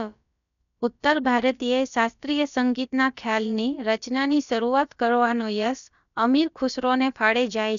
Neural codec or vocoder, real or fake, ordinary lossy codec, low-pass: codec, 16 kHz, about 1 kbps, DyCAST, with the encoder's durations; fake; none; 7.2 kHz